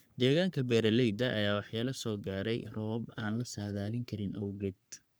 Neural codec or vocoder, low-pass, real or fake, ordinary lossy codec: codec, 44.1 kHz, 3.4 kbps, Pupu-Codec; none; fake; none